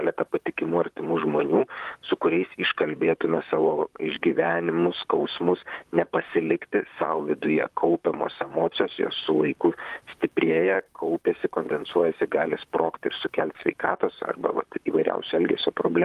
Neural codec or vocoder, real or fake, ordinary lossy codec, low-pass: vocoder, 44.1 kHz, 128 mel bands, Pupu-Vocoder; fake; Opus, 32 kbps; 14.4 kHz